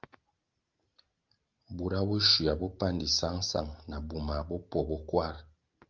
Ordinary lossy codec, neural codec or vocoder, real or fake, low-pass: Opus, 32 kbps; none; real; 7.2 kHz